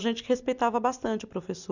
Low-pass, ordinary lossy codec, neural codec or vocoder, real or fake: 7.2 kHz; none; none; real